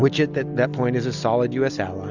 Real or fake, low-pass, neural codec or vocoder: real; 7.2 kHz; none